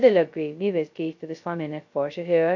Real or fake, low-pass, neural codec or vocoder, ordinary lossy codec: fake; 7.2 kHz; codec, 16 kHz, 0.2 kbps, FocalCodec; MP3, 48 kbps